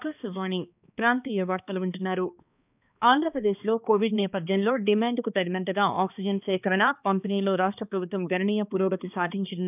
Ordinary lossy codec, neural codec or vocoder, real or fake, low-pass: none; codec, 16 kHz, 2 kbps, X-Codec, HuBERT features, trained on balanced general audio; fake; 3.6 kHz